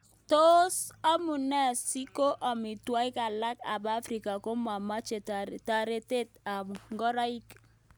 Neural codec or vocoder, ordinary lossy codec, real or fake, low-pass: none; none; real; none